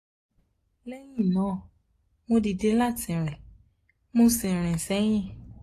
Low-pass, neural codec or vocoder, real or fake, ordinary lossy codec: 14.4 kHz; none; real; AAC, 48 kbps